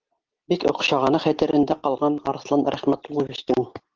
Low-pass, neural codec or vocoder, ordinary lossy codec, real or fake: 7.2 kHz; none; Opus, 16 kbps; real